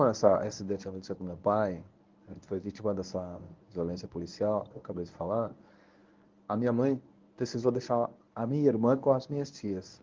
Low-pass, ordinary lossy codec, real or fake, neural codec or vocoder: 7.2 kHz; Opus, 32 kbps; fake; codec, 24 kHz, 0.9 kbps, WavTokenizer, medium speech release version 1